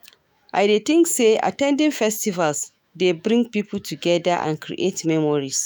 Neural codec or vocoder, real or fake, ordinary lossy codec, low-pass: autoencoder, 48 kHz, 128 numbers a frame, DAC-VAE, trained on Japanese speech; fake; none; none